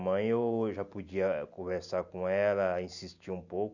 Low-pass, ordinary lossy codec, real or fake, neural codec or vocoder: 7.2 kHz; none; real; none